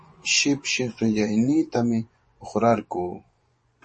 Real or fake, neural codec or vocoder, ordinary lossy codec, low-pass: fake; vocoder, 24 kHz, 100 mel bands, Vocos; MP3, 32 kbps; 10.8 kHz